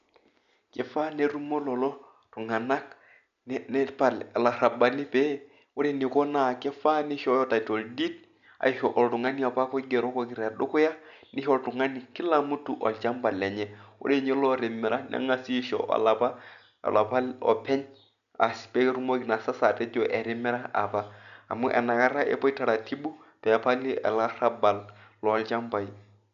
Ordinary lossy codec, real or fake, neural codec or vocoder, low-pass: none; real; none; 7.2 kHz